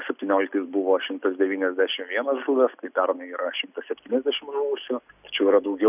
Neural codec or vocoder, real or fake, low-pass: none; real; 3.6 kHz